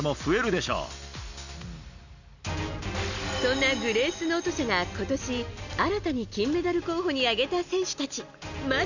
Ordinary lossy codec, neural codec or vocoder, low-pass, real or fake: none; none; 7.2 kHz; real